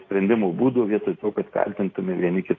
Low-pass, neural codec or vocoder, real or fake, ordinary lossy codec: 7.2 kHz; none; real; AAC, 32 kbps